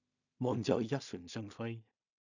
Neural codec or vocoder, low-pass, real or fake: codec, 16 kHz in and 24 kHz out, 0.4 kbps, LongCat-Audio-Codec, two codebook decoder; 7.2 kHz; fake